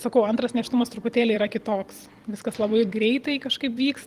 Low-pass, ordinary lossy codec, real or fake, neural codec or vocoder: 14.4 kHz; Opus, 24 kbps; fake; vocoder, 44.1 kHz, 128 mel bands every 256 samples, BigVGAN v2